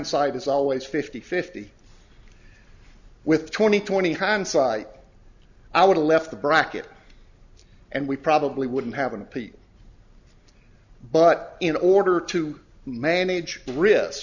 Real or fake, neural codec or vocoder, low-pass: real; none; 7.2 kHz